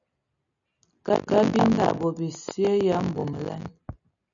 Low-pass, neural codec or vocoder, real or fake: 7.2 kHz; none; real